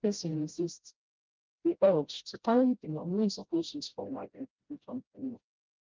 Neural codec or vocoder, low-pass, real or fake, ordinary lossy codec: codec, 16 kHz, 0.5 kbps, FreqCodec, smaller model; 7.2 kHz; fake; Opus, 32 kbps